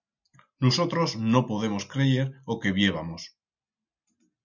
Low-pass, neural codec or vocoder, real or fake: 7.2 kHz; none; real